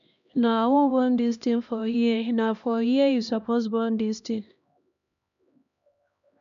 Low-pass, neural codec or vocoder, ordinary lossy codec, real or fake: 7.2 kHz; codec, 16 kHz, 1 kbps, X-Codec, HuBERT features, trained on LibriSpeech; none; fake